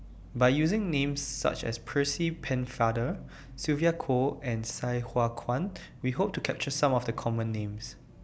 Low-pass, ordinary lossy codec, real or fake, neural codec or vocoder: none; none; real; none